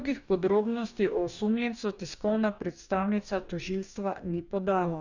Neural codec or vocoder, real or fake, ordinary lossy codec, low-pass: codec, 44.1 kHz, 2.6 kbps, DAC; fake; none; 7.2 kHz